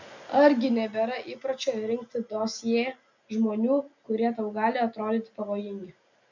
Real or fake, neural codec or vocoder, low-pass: real; none; 7.2 kHz